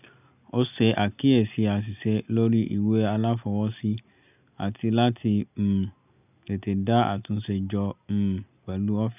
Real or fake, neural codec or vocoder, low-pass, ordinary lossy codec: real; none; 3.6 kHz; none